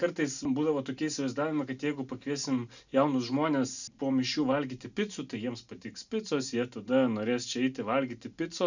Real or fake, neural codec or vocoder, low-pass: real; none; 7.2 kHz